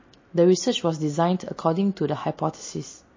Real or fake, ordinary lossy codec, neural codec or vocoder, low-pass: real; MP3, 32 kbps; none; 7.2 kHz